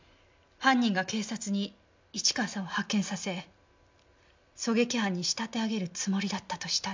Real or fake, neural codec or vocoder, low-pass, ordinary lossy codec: real; none; 7.2 kHz; MP3, 64 kbps